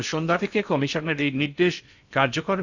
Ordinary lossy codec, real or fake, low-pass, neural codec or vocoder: none; fake; 7.2 kHz; codec, 16 kHz in and 24 kHz out, 0.6 kbps, FocalCodec, streaming, 4096 codes